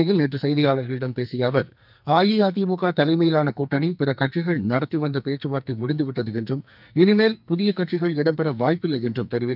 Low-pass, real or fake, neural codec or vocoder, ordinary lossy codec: 5.4 kHz; fake; codec, 44.1 kHz, 2.6 kbps, SNAC; none